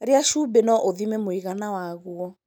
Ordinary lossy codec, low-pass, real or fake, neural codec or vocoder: none; none; real; none